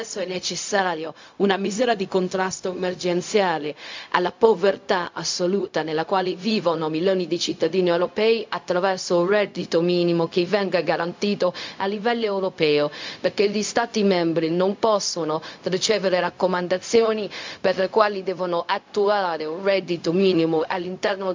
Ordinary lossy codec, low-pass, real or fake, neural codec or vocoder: MP3, 64 kbps; 7.2 kHz; fake; codec, 16 kHz, 0.4 kbps, LongCat-Audio-Codec